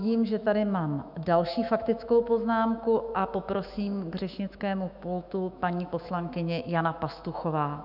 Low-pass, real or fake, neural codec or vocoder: 5.4 kHz; fake; autoencoder, 48 kHz, 128 numbers a frame, DAC-VAE, trained on Japanese speech